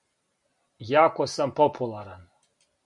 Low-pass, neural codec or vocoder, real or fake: 10.8 kHz; none; real